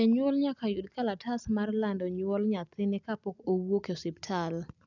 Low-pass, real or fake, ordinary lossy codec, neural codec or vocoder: 7.2 kHz; real; none; none